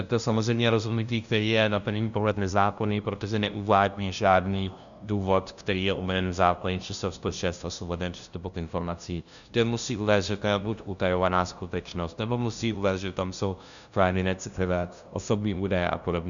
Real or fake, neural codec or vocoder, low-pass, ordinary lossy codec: fake; codec, 16 kHz, 0.5 kbps, FunCodec, trained on LibriTTS, 25 frames a second; 7.2 kHz; MP3, 96 kbps